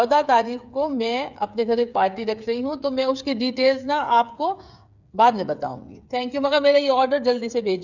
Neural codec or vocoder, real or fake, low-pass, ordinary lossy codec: codec, 16 kHz, 8 kbps, FreqCodec, smaller model; fake; 7.2 kHz; none